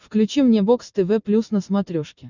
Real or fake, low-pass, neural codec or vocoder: real; 7.2 kHz; none